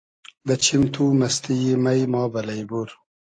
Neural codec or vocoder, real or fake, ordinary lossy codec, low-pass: none; real; AAC, 48 kbps; 9.9 kHz